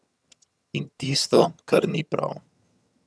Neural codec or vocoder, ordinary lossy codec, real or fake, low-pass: vocoder, 22.05 kHz, 80 mel bands, HiFi-GAN; none; fake; none